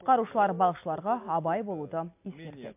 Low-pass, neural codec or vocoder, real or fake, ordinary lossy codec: 3.6 kHz; none; real; MP3, 32 kbps